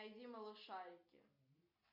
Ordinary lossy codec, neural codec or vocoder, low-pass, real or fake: MP3, 48 kbps; none; 5.4 kHz; real